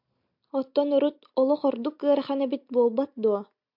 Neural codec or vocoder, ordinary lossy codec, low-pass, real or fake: none; MP3, 48 kbps; 5.4 kHz; real